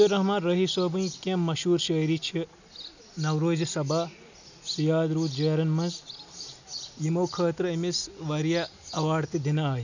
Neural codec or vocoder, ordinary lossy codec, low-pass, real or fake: none; none; 7.2 kHz; real